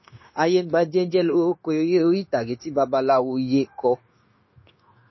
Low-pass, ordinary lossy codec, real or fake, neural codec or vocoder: 7.2 kHz; MP3, 24 kbps; fake; codec, 16 kHz, 0.9 kbps, LongCat-Audio-Codec